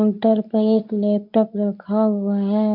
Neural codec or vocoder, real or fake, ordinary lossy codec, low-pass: codec, 24 kHz, 0.9 kbps, WavTokenizer, medium speech release version 2; fake; MP3, 48 kbps; 5.4 kHz